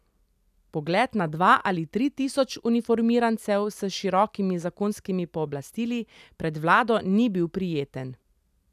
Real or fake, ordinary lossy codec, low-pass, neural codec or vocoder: real; none; 14.4 kHz; none